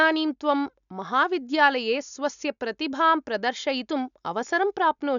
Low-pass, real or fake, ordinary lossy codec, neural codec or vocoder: 7.2 kHz; real; none; none